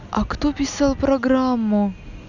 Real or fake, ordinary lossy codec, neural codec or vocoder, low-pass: real; none; none; 7.2 kHz